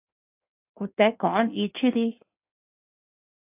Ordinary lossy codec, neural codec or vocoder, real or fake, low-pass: AAC, 32 kbps; codec, 16 kHz in and 24 kHz out, 1.1 kbps, FireRedTTS-2 codec; fake; 3.6 kHz